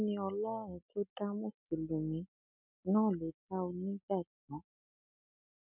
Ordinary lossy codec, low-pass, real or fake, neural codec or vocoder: none; 3.6 kHz; real; none